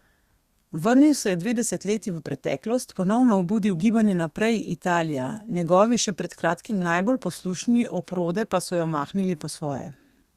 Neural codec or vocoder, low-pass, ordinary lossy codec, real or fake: codec, 32 kHz, 1.9 kbps, SNAC; 14.4 kHz; Opus, 64 kbps; fake